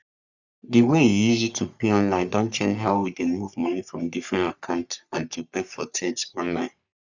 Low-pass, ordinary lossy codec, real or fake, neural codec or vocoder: 7.2 kHz; none; fake; codec, 44.1 kHz, 3.4 kbps, Pupu-Codec